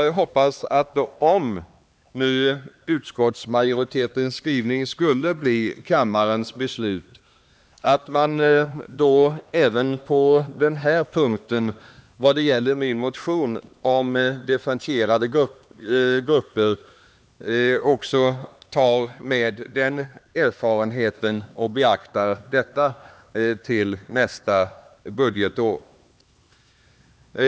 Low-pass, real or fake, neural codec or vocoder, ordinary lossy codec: none; fake; codec, 16 kHz, 2 kbps, X-Codec, HuBERT features, trained on LibriSpeech; none